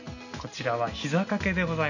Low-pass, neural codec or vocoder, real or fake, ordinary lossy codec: 7.2 kHz; none; real; none